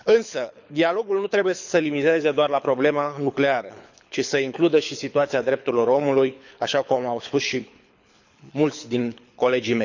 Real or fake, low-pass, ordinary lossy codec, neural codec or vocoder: fake; 7.2 kHz; none; codec, 24 kHz, 6 kbps, HILCodec